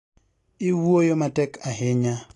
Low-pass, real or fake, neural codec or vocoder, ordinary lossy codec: 10.8 kHz; real; none; AAC, 48 kbps